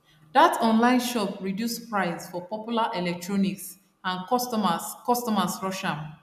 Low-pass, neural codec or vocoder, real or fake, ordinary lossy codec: 14.4 kHz; none; real; none